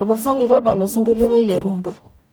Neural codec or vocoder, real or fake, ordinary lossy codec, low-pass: codec, 44.1 kHz, 0.9 kbps, DAC; fake; none; none